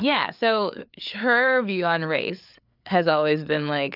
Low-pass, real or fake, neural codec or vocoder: 5.4 kHz; fake; codec, 16 kHz, 4 kbps, FreqCodec, larger model